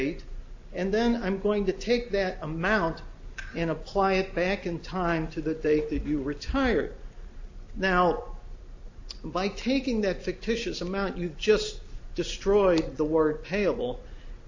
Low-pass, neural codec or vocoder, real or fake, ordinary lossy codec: 7.2 kHz; none; real; AAC, 48 kbps